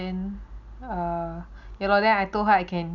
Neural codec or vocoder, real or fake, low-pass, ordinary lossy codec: none; real; 7.2 kHz; none